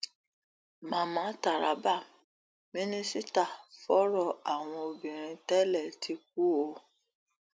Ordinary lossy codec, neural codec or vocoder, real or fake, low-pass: none; none; real; none